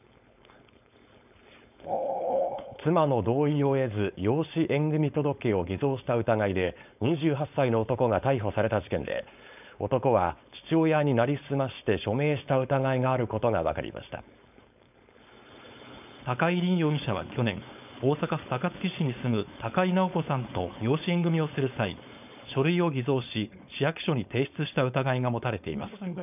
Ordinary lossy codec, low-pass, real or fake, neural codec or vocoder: none; 3.6 kHz; fake; codec, 16 kHz, 4.8 kbps, FACodec